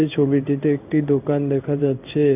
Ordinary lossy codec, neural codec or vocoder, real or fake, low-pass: none; codec, 16 kHz in and 24 kHz out, 1 kbps, XY-Tokenizer; fake; 3.6 kHz